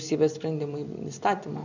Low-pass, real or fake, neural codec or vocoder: 7.2 kHz; real; none